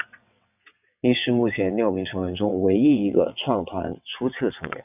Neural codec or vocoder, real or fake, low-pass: vocoder, 22.05 kHz, 80 mel bands, WaveNeXt; fake; 3.6 kHz